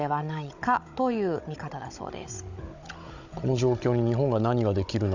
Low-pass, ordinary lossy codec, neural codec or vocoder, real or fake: 7.2 kHz; none; codec, 16 kHz, 16 kbps, FunCodec, trained on Chinese and English, 50 frames a second; fake